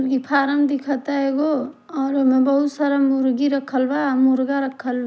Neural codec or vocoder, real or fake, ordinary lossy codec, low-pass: none; real; none; none